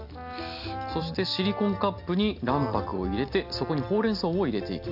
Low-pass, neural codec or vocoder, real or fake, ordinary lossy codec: 5.4 kHz; none; real; AAC, 48 kbps